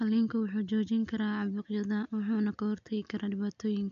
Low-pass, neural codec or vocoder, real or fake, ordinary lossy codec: 7.2 kHz; none; real; MP3, 96 kbps